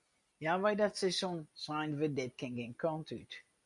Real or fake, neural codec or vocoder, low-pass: real; none; 10.8 kHz